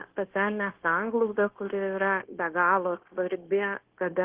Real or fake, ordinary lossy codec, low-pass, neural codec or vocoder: fake; Opus, 16 kbps; 3.6 kHz; codec, 16 kHz, 0.9 kbps, LongCat-Audio-Codec